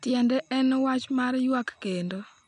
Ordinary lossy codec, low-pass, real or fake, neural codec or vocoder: none; 9.9 kHz; real; none